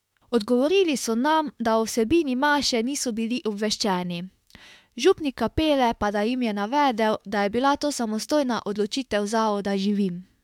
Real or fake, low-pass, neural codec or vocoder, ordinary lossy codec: fake; 19.8 kHz; autoencoder, 48 kHz, 32 numbers a frame, DAC-VAE, trained on Japanese speech; MP3, 96 kbps